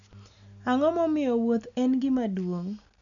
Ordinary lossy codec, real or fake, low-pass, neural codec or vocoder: none; real; 7.2 kHz; none